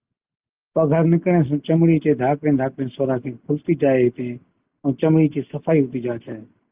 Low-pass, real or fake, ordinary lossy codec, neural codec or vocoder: 3.6 kHz; real; Opus, 24 kbps; none